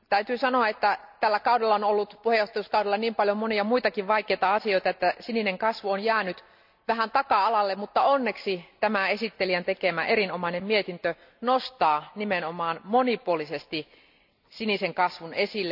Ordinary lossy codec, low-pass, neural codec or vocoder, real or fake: none; 5.4 kHz; none; real